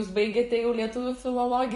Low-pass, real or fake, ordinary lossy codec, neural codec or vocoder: 14.4 kHz; fake; MP3, 48 kbps; vocoder, 44.1 kHz, 128 mel bands every 256 samples, BigVGAN v2